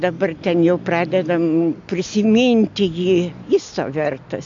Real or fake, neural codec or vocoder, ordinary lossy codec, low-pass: real; none; AAC, 64 kbps; 7.2 kHz